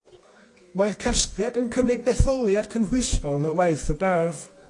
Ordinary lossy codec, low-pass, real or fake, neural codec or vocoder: AAC, 48 kbps; 10.8 kHz; fake; codec, 24 kHz, 0.9 kbps, WavTokenizer, medium music audio release